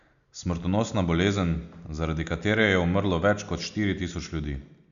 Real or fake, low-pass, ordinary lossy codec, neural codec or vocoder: real; 7.2 kHz; none; none